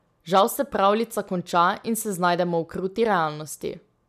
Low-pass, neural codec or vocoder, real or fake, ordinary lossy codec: 14.4 kHz; none; real; none